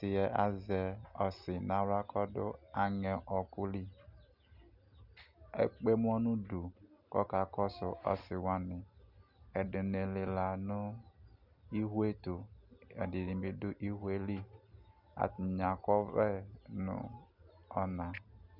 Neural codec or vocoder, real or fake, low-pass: none; real; 5.4 kHz